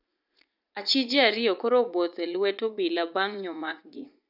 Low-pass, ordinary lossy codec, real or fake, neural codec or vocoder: 5.4 kHz; none; fake; vocoder, 44.1 kHz, 80 mel bands, Vocos